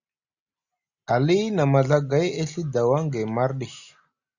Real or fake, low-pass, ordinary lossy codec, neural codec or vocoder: real; 7.2 kHz; Opus, 64 kbps; none